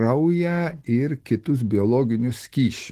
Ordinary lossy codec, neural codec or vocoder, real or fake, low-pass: Opus, 16 kbps; autoencoder, 48 kHz, 128 numbers a frame, DAC-VAE, trained on Japanese speech; fake; 14.4 kHz